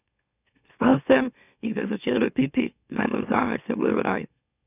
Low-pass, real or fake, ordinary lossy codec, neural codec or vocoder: 3.6 kHz; fake; none; autoencoder, 44.1 kHz, a latent of 192 numbers a frame, MeloTTS